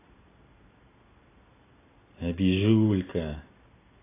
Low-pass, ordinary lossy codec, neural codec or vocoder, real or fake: 3.6 kHz; AAC, 16 kbps; none; real